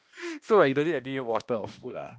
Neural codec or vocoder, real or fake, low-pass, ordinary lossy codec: codec, 16 kHz, 1 kbps, X-Codec, HuBERT features, trained on balanced general audio; fake; none; none